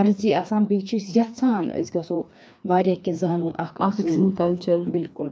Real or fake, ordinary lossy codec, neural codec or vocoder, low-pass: fake; none; codec, 16 kHz, 2 kbps, FreqCodec, larger model; none